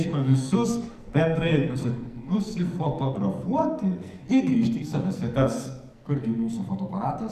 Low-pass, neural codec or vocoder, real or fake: 14.4 kHz; codec, 44.1 kHz, 2.6 kbps, SNAC; fake